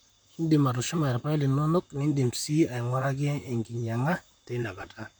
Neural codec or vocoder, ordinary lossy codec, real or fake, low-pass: vocoder, 44.1 kHz, 128 mel bands, Pupu-Vocoder; none; fake; none